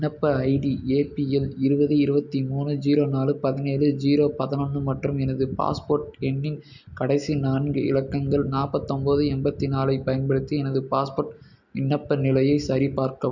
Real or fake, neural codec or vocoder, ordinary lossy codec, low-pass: real; none; none; 7.2 kHz